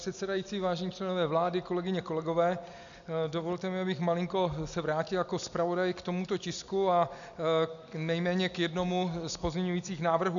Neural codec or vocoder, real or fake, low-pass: none; real; 7.2 kHz